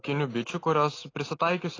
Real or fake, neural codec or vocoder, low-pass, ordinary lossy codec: real; none; 7.2 kHz; AAC, 32 kbps